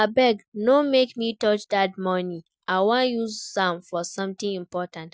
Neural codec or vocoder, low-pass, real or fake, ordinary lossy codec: none; none; real; none